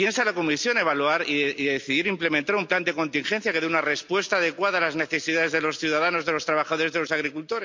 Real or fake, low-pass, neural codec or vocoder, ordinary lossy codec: real; 7.2 kHz; none; MP3, 64 kbps